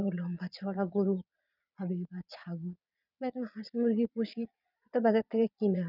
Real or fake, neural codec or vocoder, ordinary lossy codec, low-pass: fake; vocoder, 22.05 kHz, 80 mel bands, Vocos; none; 5.4 kHz